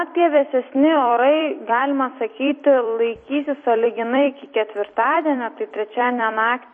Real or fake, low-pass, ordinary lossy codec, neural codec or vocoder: fake; 9.9 kHz; MP3, 32 kbps; vocoder, 44.1 kHz, 128 mel bands every 256 samples, BigVGAN v2